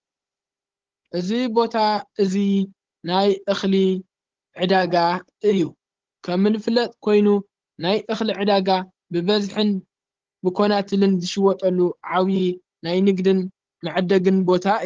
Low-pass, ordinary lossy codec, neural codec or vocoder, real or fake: 7.2 kHz; Opus, 16 kbps; codec, 16 kHz, 16 kbps, FunCodec, trained on Chinese and English, 50 frames a second; fake